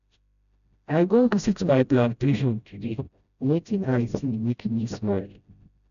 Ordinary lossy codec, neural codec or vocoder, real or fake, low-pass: none; codec, 16 kHz, 0.5 kbps, FreqCodec, smaller model; fake; 7.2 kHz